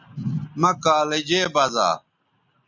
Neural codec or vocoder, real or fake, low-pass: none; real; 7.2 kHz